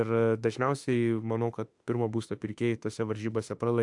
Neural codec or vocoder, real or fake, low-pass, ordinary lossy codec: autoencoder, 48 kHz, 32 numbers a frame, DAC-VAE, trained on Japanese speech; fake; 10.8 kHz; AAC, 64 kbps